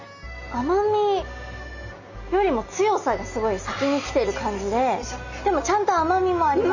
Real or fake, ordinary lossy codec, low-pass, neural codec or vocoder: real; none; 7.2 kHz; none